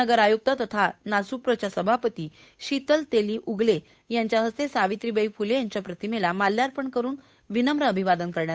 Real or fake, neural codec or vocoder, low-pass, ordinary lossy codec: fake; codec, 16 kHz, 8 kbps, FunCodec, trained on Chinese and English, 25 frames a second; none; none